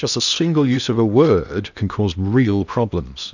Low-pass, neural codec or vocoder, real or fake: 7.2 kHz; codec, 16 kHz in and 24 kHz out, 0.8 kbps, FocalCodec, streaming, 65536 codes; fake